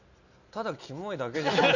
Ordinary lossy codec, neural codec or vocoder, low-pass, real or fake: none; none; 7.2 kHz; real